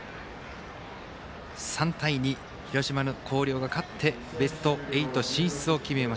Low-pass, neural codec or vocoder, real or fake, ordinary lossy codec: none; none; real; none